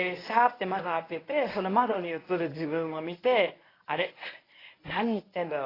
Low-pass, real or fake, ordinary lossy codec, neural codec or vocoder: 5.4 kHz; fake; AAC, 24 kbps; codec, 24 kHz, 0.9 kbps, WavTokenizer, medium speech release version 1